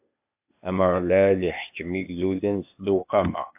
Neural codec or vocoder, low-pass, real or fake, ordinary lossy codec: codec, 16 kHz, 0.8 kbps, ZipCodec; 3.6 kHz; fake; AAC, 32 kbps